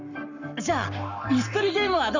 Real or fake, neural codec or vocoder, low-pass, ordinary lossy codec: fake; codec, 44.1 kHz, 7.8 kbps, Pupu-Codec; 7.2 kHz; none